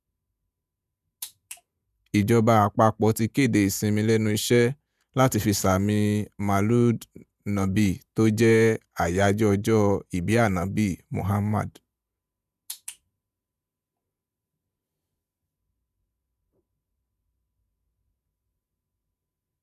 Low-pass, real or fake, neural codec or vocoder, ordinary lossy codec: 14.4 kHz; fake; vocoder, 48 kHz, 128 mel bands, Vocos; none